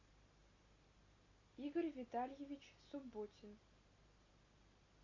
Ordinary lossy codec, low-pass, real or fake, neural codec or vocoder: AAC, 32 kbps; 7.2 kHz; real; none